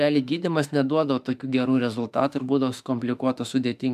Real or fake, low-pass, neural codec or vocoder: fake; 14.4 kHz; autoencoder, 48 kHz, 32 numbers a frame, DAC-VAE, trained on Japanese speech